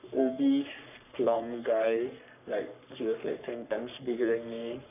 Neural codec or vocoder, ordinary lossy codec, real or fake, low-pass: codec, 44.1 kHz, 3.4 kbps, Pupu-Codec; none; fake; 3.6 kHz